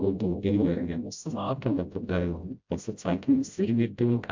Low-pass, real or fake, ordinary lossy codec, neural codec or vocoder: 7.2 kHz; fake; MP3, 64 kbps; codec, 16 kHz, 0.5 kbps, FreqCodec, smaller model